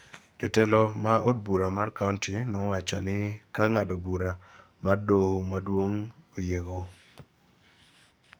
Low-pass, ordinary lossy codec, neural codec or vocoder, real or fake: none; none; codec, 44.1 kHz, 2.6 kbps, SNAC; fake